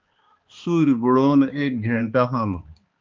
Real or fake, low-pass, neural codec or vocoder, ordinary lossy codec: fake; 7.2 kHz; codec, 16 kHz, 2 kbps, X-Codec, HuBERT features, trained on balanced general audio; Opus, 32 kbps